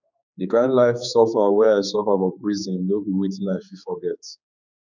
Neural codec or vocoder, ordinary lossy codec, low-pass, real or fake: codec, 16 kHz, 4 kbps, X-Codec, HuBERT features, trained on general audio; none; 7.2 kHz; fake